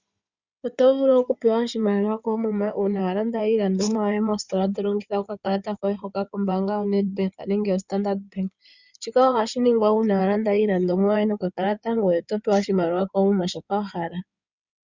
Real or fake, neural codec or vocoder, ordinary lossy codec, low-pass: fake; codec, 16 kHz in and 24 kHz out, 2.2 kbps, FireRedTTS-2 codec; Opus, 64 kbps; 7.2 kHz